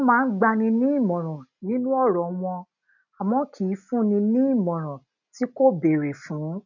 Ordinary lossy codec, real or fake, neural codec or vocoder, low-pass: none; real; none; 7.2 kHz